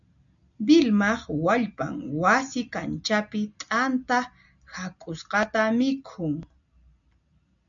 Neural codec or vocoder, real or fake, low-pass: none; real; 7.2 kHz